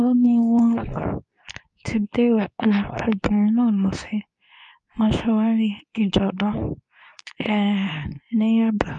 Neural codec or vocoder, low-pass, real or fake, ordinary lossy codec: codec, 24 kHz, 0.9 kbps, WavTokenizer, medium speech release version 2; 10.8 kHz; fake; none